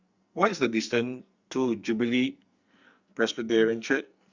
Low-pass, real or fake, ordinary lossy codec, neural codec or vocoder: 7.2 kHz; fake; Opus, 64 kbps; codec, 44.1 kHz, 2.6 kbps, SNAC